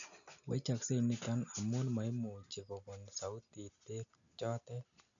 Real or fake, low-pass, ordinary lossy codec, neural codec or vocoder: real; 7.2 kHz; none; none